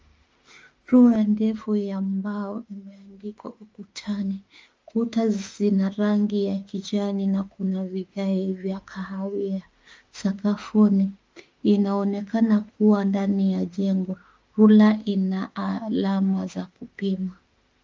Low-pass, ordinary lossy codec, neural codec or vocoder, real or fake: 7.2 kHz; Opus, 24 kbps; autoencoder, 48 kHz, 32 numbers a frame, DAC-VAE, trained on Japanese speech; fake